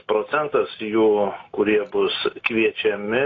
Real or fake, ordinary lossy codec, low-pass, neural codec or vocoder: real; AAC, 32 kbps; 7.2 kHz; none